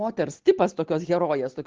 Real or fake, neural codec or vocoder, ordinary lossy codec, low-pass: real; none; Opus, 16 kbps; 7.2 kHz